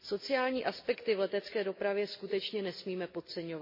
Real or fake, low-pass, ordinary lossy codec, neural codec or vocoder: real; 5.4 kHz; MP3, 24 kbps; none